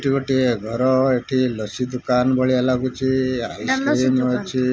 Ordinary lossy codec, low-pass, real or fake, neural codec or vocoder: none; none; real; none